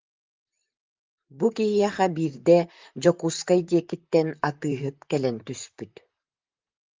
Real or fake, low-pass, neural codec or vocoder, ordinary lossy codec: fake; 7.2 kHz; vocoder, 44.1 kHz, 128 mel bands, Pupu-Vocoder; Opus, 24 kbps